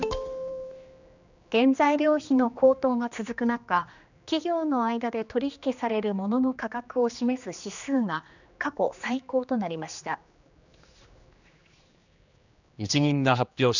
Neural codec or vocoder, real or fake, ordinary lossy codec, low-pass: codec, 16 kHz, 2 kbps, X-Codec, HuBERT features, trained on general audio; fake; none; 7.2 kHz